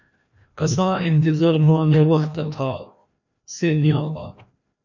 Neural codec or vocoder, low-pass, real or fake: codec, 16 kHz, 1 kbps, FreqCodec, larger model; 7.2 kHz; fake